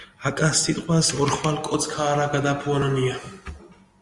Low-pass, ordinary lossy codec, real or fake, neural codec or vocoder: 10.8 kHz; Opus, 32 kbps; real; none